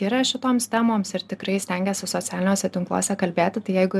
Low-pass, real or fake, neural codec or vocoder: 14.4 kHz; real; none